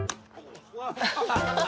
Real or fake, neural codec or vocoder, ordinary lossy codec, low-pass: real; none; none; none